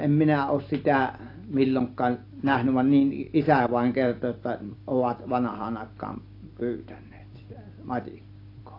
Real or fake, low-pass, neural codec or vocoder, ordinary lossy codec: real; 5.4 kHz; none; AAC, 32 kbps